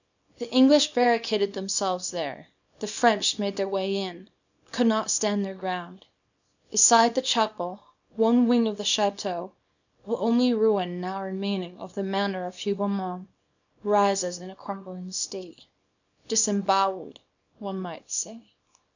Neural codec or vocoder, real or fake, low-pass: codec, 24 kHz, 0.9 kbps, WavTokenizer, small release; fake; 7.2 kHz